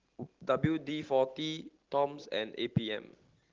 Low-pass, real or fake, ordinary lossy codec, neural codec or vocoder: 7.2 kHz; real; Opus, 16 kbps; none